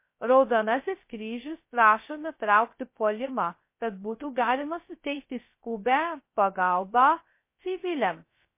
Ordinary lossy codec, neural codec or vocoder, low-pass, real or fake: MP3, 24 kbps; codec, 16 kHz, 0.2 kbps, FocalCodec; 3.6 kHz; fake